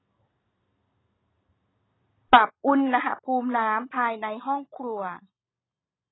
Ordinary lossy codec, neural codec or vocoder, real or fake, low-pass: AAC, 16 kbps; none; real; 7.2 kHz